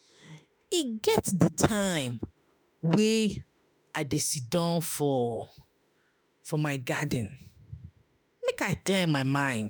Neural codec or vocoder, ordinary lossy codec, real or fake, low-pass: autoencoder, 48 kHz, 32 numbers a frame, DAC-VAE, trained on Japanese speech; none; fake; none